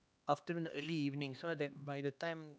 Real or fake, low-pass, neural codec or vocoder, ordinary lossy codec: fake; none; codec, 16 kHz, 2 kbps, X-Codec, HuBERT features, trained on LibriSpeech; none